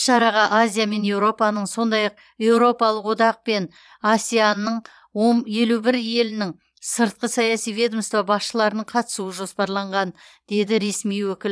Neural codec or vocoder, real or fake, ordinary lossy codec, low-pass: vocoder, 22.05 kHz, 80 mel bands, Vocos; fake; none; none